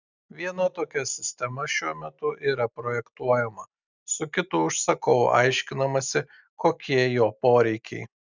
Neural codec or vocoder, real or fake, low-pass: none; real; 7.2 kHz